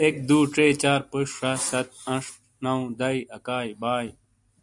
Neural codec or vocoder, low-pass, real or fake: none; 10.8 kHz; real